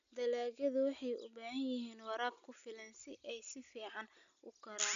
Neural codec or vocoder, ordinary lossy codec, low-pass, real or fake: none; none; 7.2 kHz; real